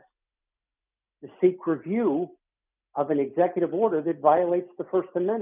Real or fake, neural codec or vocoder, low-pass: real; none; 3.6 kHz